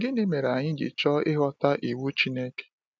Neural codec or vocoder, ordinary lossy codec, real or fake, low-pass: none; none; real; none